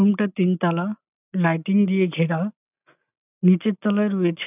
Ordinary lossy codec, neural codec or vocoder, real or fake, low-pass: none; none; real; 3.6 kHz